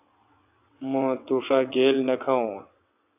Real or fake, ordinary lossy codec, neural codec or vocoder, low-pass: fake; AAC, 32 kbps; vocoder, 22.05 kHz, 80 mel bands, WaveNeXt; 3.6 kHz